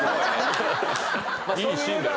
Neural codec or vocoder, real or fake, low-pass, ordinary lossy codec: none; real; none; none